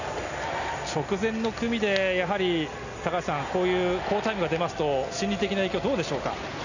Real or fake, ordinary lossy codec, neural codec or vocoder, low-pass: real; none; none; 7.2 kHz